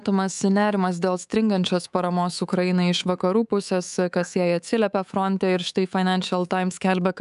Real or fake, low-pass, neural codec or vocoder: fake; 10.8 kHz; codec, 24 kHz, 3.1 kbps, DualCodec